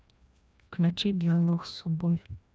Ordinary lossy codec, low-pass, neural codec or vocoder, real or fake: none; none; codec, 16 kHz, 1 kbps, FreqCodec, larger model; fake